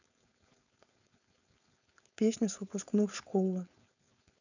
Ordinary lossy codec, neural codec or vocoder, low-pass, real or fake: none; codec, 16 kHz, 4.8 kbps, FACodec; 7.2 kHz; fake